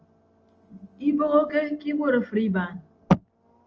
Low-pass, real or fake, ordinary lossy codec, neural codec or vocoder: 7.2 kHz; real; Opus, 24 kbps; none